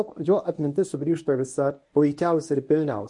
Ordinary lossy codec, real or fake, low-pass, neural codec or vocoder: MP3, 48 kbps; fake; 10.8 kHz; codec, 24 kHz, 0.9 kbps, WavTokenizer, medium speech release version 1